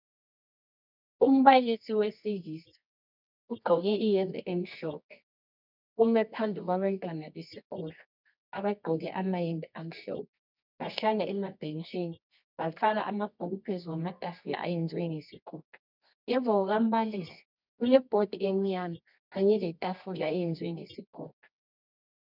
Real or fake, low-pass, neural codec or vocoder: fake; 5.4 kHz; codec, 24 kHz, 0.9 kbps, WavTokenizer, medium music audio release